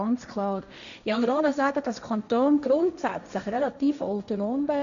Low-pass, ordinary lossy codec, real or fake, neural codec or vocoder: 7.2 kHz; none; fake; codec, 16 kHz, 1.1 kbps, Voila-Tokenizer